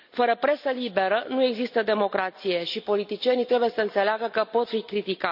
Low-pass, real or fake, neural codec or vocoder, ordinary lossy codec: 5.4 kHz; real; none; none